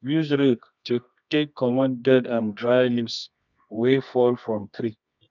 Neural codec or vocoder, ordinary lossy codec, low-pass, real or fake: codec, 24 kHz, 0.9 kbps, WavTokenizer, medium music audio release; none; 7.2 kHz; fake